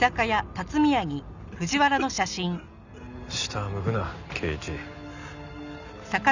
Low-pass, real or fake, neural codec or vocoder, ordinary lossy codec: 7.2 kHz; real; none; none